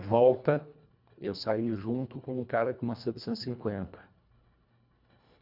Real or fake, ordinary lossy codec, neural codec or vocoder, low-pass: fake; none; codec, 24 kHz, 1.5 kbps, HILCodec; 5.4 kHz